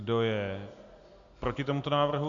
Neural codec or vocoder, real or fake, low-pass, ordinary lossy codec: none; real; 7.2 kHz; AAC, 64 kbps